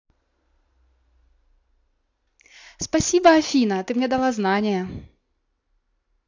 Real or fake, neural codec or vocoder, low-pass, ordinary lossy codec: real; none; 7.2 kHz; AAC, 48 kbps